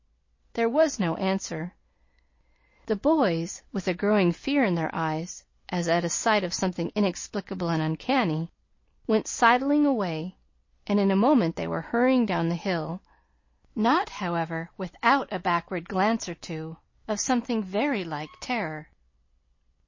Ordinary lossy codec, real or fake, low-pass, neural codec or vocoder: MP3, 32 kbps; real; 7.2 kHz; none